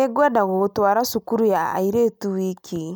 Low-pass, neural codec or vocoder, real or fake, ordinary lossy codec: none; none; real; none